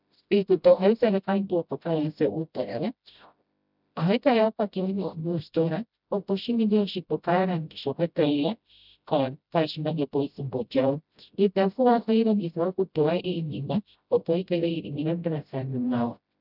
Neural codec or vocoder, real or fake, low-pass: codec, 16 kHz, 0.5 kbps, FreqCodec, smaller model; fake; 5.4 kHz